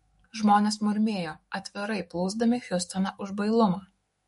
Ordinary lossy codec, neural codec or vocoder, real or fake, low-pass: MP3, 48 kbps; autoencoder, 48 kHz, 128 numbers a frame, DAC-VAE, trained on Japanese speech; fake; 19.8 kHz